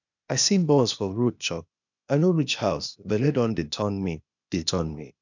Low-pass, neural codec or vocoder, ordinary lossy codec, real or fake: 7.2 kHz; codec, 16 kHz, 0.8 kbps, ZipCodec; none; fake